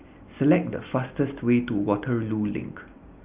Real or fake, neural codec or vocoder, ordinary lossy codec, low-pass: real; none; Opus, 24 kbps; 3.6 kHz